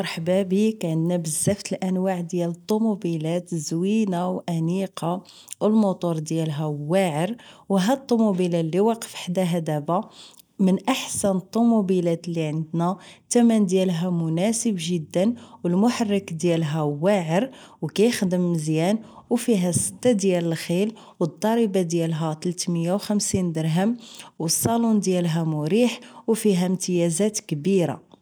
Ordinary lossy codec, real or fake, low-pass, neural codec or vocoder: none; real; none; none